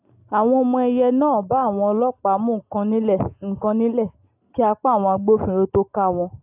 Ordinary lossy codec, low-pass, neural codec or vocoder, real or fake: AAC, 32 kbps; 3.6 kHz; none; real